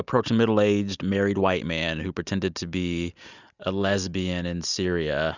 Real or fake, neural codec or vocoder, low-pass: real; none; 7.2 kHz